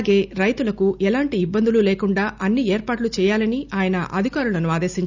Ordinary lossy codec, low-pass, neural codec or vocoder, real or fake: none; 7.2 kHz; none; real